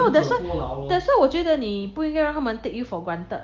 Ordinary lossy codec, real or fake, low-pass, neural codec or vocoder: Opus, 32 kbps; real; 7.2 kHz; none